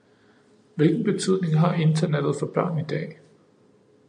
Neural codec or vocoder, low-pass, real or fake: none; 9.9 kHz; real